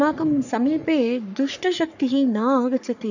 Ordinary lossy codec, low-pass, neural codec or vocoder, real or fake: none; 7.2 kHz; codec, 44.1 kHz, 3.4 kbps, Pupu-Codec; fake